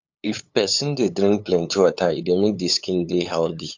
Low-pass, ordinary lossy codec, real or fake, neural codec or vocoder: 7.2 kHz; AAC, 48 kbps; fake; codec, 16 kHz, 8 kbps, FunCodec, trained on LibriTTS, 25 frames a second